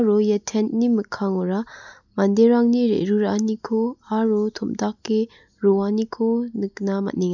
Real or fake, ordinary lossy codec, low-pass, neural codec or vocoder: real; none; 7.2 kHz; none